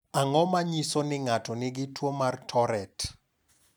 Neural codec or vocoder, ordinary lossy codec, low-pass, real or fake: none; none; none; real